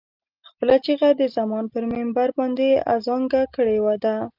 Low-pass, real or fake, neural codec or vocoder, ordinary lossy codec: 5.4 kHz; real; none; Opus, 24 kbps